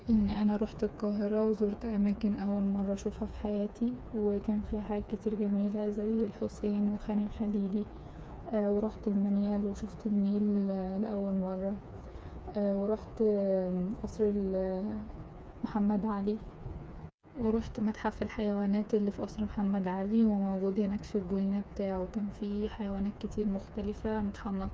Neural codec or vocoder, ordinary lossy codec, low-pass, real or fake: codec, 16 kHz, 4 kbps, FreqCodec, smaller model; none; none; fake